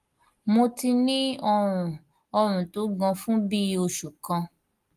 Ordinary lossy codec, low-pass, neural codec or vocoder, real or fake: Opus, 24 kbps; 14.4 kHz; none; real